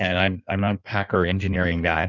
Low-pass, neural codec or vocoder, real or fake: 7.2 kHz; codec, 16 kHz in and 24 kHz out, 1.1 kbps, FireRedTTS-2 codec; fake